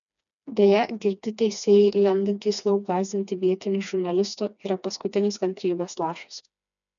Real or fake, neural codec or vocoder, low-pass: fake; codec, 16 kHz, 2 kbps, FreqCodec, smaller model; 7.2 kHz